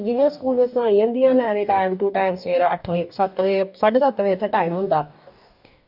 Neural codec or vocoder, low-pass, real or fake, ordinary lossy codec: codec, 44.1 kHz, 2.6 kbps, DAC; 5.4 kHz; fake; none